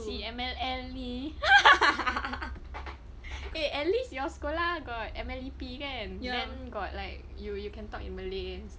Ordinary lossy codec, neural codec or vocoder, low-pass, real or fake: none; none; none; real